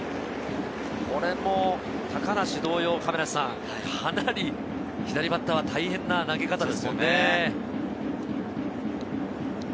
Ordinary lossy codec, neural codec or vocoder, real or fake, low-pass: none; none; real; none